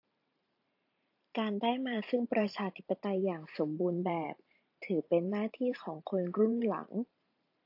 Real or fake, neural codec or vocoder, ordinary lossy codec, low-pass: fake; vocoder, 44.1 kHz, 128 mel bands every 512 samples, BigVGAN v2; AAC, 48 kbps; 5.4 kHz